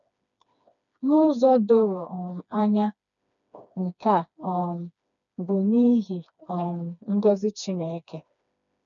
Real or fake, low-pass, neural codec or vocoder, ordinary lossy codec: fake; 7.2 kHz; codec, 16 kHz, 2 kbps, FreqCodec, smaller model; none